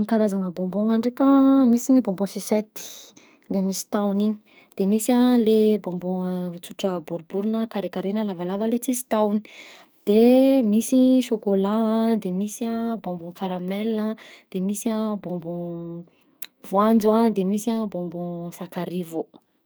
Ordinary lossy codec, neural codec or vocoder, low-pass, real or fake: none; codec, 44.1 kHz, 2.6 kbps, SNAC; none; fake